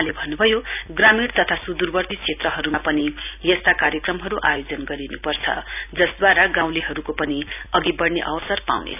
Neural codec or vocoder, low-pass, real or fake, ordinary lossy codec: none; 3.6 kHz; real; none